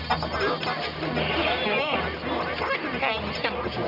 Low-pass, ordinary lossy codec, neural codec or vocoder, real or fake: 5.4 kHz; none; codec, 44.1 kHz, 1.7 kbps, Pupu-Codec; fake